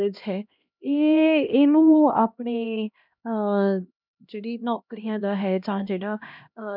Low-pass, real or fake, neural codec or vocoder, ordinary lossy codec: 5.4 kHz; fake; codec, 16 kHz, 1 kbps, X-Codec, HuBERT features, trained on LibriSpeech; none